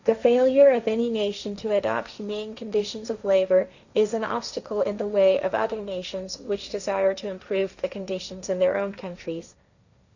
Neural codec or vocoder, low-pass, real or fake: codec, 16 kHz, 1.1 kbps, Voila-Tokenizer; 7.2 kHz; fake